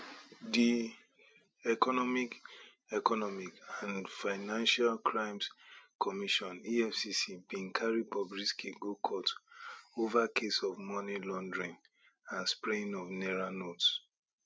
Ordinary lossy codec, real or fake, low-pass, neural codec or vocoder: none; real; none; none